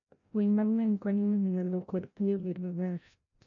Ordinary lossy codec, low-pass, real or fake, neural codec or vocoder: none; 7.2 kHz; fake; codec, 16 kHz, 0.5 kbps, FreqCodec, larger model